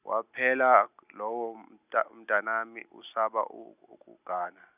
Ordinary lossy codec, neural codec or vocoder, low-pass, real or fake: none; none; 3.6 kHz; real